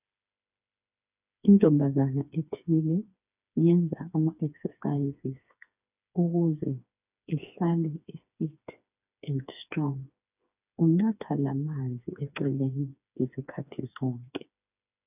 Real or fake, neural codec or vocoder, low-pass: fake; codec, 16 kHz, 4 kbps, FreqCodec, smaller model; 3.6 kHz